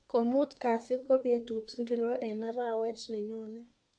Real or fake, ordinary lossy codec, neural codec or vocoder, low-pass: fake; MP3, 64 kbps; codec, 24 kHz, 1 kbps, SNAC; 9.9 kHz